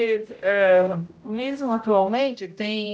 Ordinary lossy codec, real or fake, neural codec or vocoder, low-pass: none; fake; codec, 16 kHz, 0.5 kbps, X-Codec, HuBERT features, trained on general audio; none